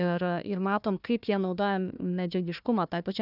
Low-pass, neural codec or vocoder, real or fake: 5.4 kHz; codec, 16 kHz, 1 kbps, FunCodec, trained on Chinese and English, 50 frames a second; fake